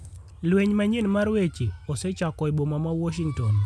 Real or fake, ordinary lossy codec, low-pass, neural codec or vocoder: real; none; none; none